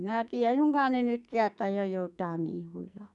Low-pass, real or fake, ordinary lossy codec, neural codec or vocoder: 10.8 kHz; fake; none; codec, 44.1 kHz, 2.6 kbps, SNAC